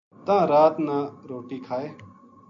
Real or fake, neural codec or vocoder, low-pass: real; none; 7.2 kHz